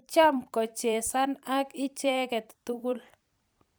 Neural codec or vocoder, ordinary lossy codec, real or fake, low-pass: vocoder, 44.1 kHz, 128 mel bands every 256 samples, BigVGAN v2; none; fake; none